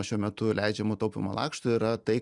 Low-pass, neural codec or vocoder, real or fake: 10.8 kHz; none; real